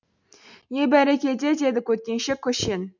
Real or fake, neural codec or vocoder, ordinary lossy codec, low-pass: real; none; none; 7.2 kHz